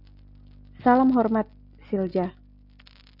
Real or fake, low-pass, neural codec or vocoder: real; 5.4 kHz; none